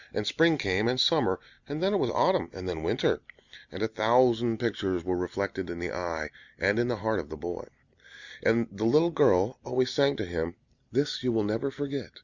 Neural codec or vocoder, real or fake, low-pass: none; real; 7.2 kHz